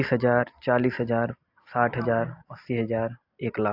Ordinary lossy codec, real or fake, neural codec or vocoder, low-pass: AAC, 48 kbps; real; none; 5.4 kHz